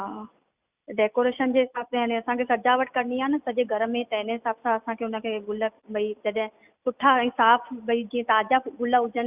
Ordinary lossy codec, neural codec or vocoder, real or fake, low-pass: Opus, 64 kbps; none; real; 3.6 kHz